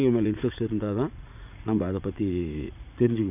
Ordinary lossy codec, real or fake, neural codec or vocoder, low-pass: none; fake; vocoder, 44.1 kHz, 80 mel bands, Vocos; 3.6 kHz